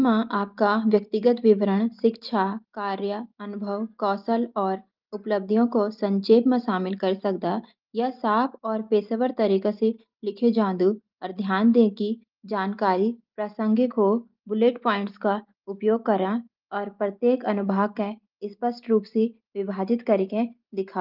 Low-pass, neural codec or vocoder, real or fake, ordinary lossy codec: 5.4 kHz; none; real; Opus, 32 kbps